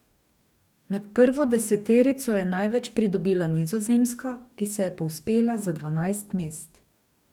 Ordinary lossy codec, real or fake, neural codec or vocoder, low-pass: none; fake; codec, 44.1 kHz, 2.6 kbps, DAC; 19.8 kHz